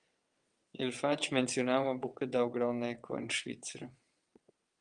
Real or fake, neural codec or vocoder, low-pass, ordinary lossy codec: fake; vocoder, 22.05 kHz, 80 mel bands, WaveNeXt; 9.9 kHz; Opus, 32 kbps